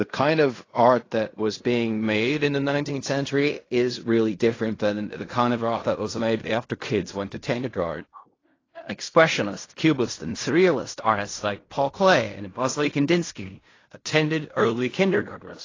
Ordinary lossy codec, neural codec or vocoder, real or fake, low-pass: AAC, 32 kbps; codec, 16 kHz in and 24 kHz out, 0.4 kbps, LongCat-Audio-Codec, fine tuned four codebook decoder; fake; 7.2 kHz